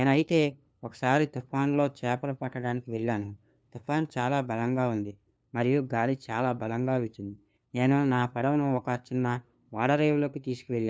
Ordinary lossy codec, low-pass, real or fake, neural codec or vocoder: none; none; fake; codec, 16 kHz, 2 kbps, FunCodec, trained on LibriTTS, 25 frames a second